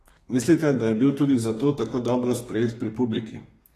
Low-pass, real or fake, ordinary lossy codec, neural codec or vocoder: 14.4 kHz; fake; AAC, 48 kbps; codec, 32 kHz, 1.9 kbps, SNAC